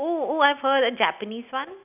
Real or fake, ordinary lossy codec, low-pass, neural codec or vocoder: real; none; 3.6 kHz; none